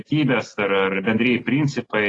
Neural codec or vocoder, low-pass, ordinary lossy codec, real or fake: none; 10.8 kHz; AAC, 32 kbps; real